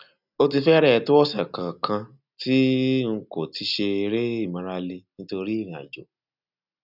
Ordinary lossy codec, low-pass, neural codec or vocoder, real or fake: none; 5.4 kHz; none; real